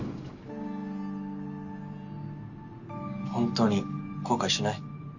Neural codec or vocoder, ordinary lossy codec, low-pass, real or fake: none; none; 7.2 kHz; real